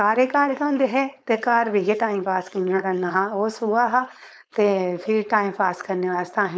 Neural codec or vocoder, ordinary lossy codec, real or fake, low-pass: codec, 16 kHz, 4.8 kbps, FACodec; none; fake; none